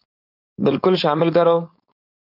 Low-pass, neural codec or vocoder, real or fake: 5.4 kHz; codec, 16 kHz, 4.8 kbps, FACodec; fake